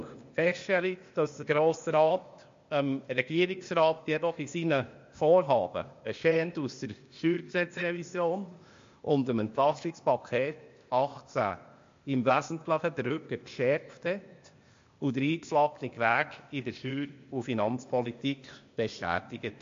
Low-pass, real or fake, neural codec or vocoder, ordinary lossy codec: 7.2 kHz; fake; codec, 16 kHz, 0.8 kbps, ZipCodec; MP3, 48 kbps